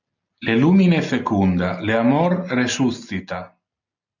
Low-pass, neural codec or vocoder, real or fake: 7.2 kHz; none; real